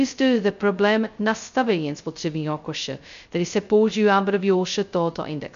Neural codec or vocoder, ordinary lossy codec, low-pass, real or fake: codec, 16 kHz, 0.2 kbps, FocalCodec; MP3, 64 kbps; 7.2 kHz; fake